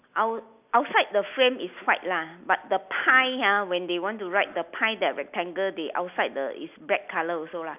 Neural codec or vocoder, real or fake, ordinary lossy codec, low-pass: none; real; none; 3.6 kHz